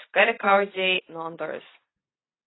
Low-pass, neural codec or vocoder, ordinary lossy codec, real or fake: 7.2 kHz; none; AAC, 16 kbps; real